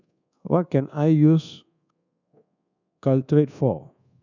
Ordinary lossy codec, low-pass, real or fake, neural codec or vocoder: none; 7.2 kHz; fake; codec, 24 kHz, 1.2 kbps, DualCodec